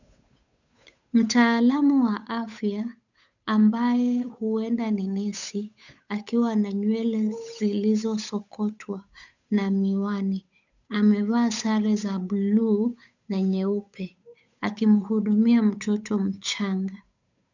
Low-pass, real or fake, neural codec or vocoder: 7.2 kHz; fake; codec, 16 kHz, 8 kbps, FunCodec, trained on Chinese and English, 25 frames a second